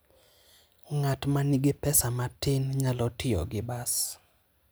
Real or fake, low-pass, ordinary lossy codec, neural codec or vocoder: real; none; none; none